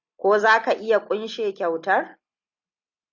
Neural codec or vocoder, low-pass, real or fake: none; 7.2 kHz; real